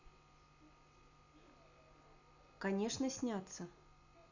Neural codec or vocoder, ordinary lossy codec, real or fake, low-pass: none; none; real; 7.2 kHz